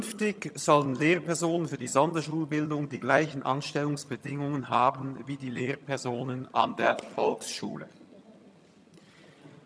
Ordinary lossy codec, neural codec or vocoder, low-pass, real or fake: none; vocoder, 22.05 kHz, 80 mel bands, HiFi-GAN; none; fake